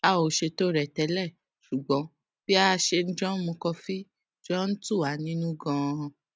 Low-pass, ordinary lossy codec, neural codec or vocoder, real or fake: none; none; none; real